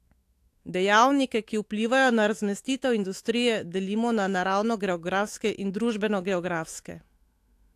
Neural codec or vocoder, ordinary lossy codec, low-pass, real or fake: autoencoder, 48 kHz, 128 numbers a frame, DAC-VAE, trained on Japanese speech; AAC, 64 kbps; 14.4 kHz; fake